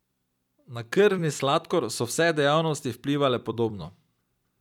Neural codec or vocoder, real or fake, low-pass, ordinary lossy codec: vocoder, 44.1 kHz, 128 mel bands every 256 samples, BigVGAN v2; fake; 19.8 kHz; none